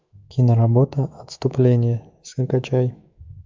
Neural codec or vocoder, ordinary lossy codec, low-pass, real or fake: autoencoder, 48 kHz, 128 numbers a frame, DAC-VAE, trained on Japanese speech; MP3, 64 kbps; 7.2 kHz; fake